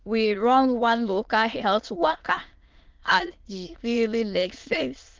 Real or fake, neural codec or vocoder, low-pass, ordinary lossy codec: fake; autoencoder, 22.05 kHz, a latent of 192 numbers a frame, VITS, trained on many speakers; 7.2 kHz; Opus, 32 kbps